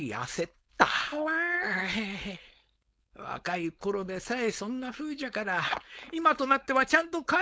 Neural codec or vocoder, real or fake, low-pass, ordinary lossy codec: codec, 16 kHz, 4.8 kbps, FACodec; fake; none; none